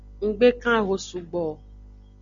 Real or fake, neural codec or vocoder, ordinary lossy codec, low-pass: real; none; Opus, 64 kbps; 7.2 kHz